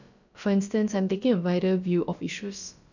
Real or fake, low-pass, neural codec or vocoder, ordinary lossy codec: fake; 7.2 kHz; codec, 16 kHz, about 1 kbps, DyCAST, with the encoder's durations; none